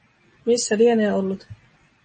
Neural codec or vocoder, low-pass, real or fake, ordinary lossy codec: none; 10.8 kHz; real; MP3, 32 kbps